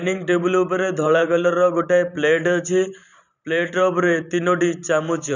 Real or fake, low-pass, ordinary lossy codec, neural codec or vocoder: fake; 7.2 kHz; none; vocoder, 44.1 kHz, 128 mel bands every 512 samples, BigVGAN v2